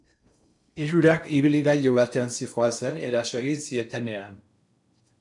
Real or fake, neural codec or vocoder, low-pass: fake; codec, 16 kHz in and 24 kHz out, 0.6 kbps, FocalCodec, streaming, 4096 codes; 10.8 kHz